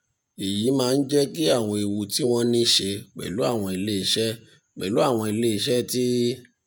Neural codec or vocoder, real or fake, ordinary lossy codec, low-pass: vocoder, 48 kHz, 128 mel bands, Vocos; fake; none; none